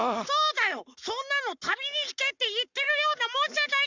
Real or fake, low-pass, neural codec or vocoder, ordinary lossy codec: fake; 7.2 kHz; codec, 44.1 kHz, 7.8 kbps, Pupu-Codec; none